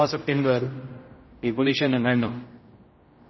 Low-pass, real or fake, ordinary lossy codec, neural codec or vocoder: 7.2 kHz; fake; MP3, 24 kbps; codec, 16 kHz, 0.5 kbps, X-Codec, HuBERT features, trained on general audio